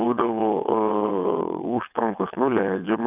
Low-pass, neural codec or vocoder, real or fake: 3.6 kHz; vocoder, 22.05 kHz, 80 mel bands, WaveNeXt; fake